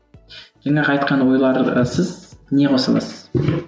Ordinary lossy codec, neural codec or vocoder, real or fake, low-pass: none; none; real; none